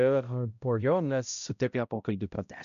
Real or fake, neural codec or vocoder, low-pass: fake; codec, 16 kHz, 0.5 kbps, X-Codec, HuBERT features, trained on balanced general audio; 7.2 kHz